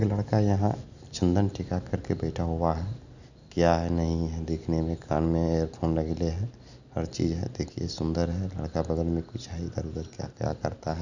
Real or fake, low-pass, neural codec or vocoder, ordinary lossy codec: real; 7.2 kHz; none; none